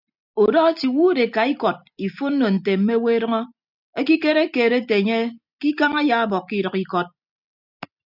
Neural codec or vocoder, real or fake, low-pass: none; real; 5.4 kHz